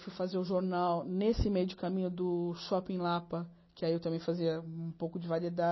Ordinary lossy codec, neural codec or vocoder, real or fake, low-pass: MP3, 24 kbps; none; real; 7.2 kHz